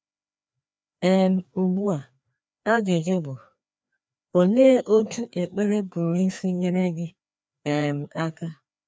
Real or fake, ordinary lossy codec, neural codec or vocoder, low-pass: fake; none; codec, 16 kHz, 2 kbps, FreqCodec, larger model; none